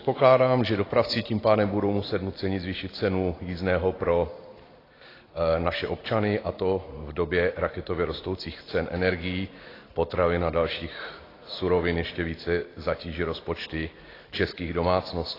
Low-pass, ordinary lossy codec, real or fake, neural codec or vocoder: 5.4 kHz; AAC, 24 kbps; real; none